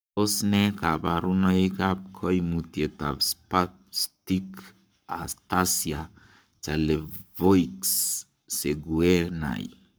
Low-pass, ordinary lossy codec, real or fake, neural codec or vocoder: none; none; fake; codec, 44.1 kHz, 7.8 kbps, Pupu-Codec